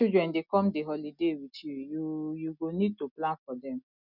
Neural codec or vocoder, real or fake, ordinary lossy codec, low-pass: none; real; none; 5.4 kHz